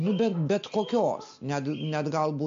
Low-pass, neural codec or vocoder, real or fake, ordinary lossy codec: 7.2 kHz; none; real; MP3, 48 kbps